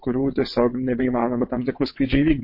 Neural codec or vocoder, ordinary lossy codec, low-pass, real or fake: vocoder, 22.05 kHz, 80 mel bands, WaveNeXt; MP3, 32 kbps; 5.4 kHz; fake